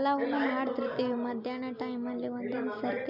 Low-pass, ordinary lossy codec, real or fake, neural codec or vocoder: 5.4 kHz; none; real; none